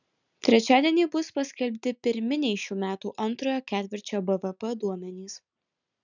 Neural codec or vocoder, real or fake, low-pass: none; real; 7.2 kHz